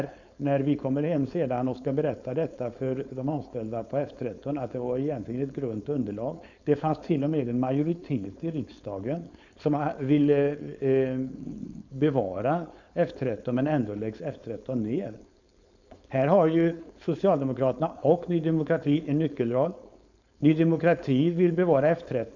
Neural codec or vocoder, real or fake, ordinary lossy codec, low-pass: codec, 16 kHz, 4.8 kbps, FACodec; fake; none; 7.2 kHz